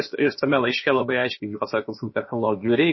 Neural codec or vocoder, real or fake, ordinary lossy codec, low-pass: codec, 16 kHz, about 1 kbps, DyCAST, with the encoder's durations; fake; MP3, 24 kbps; 7.2 kHz